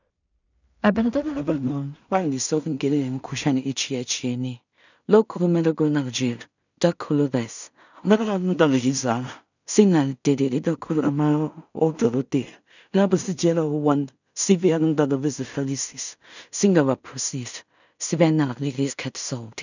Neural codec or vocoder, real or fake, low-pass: codec, 16 kHz in and 24 kHz out, 0.4 kbps, LongCat-Audio-Codec, two codebook decoder; fake; 7.2 kHz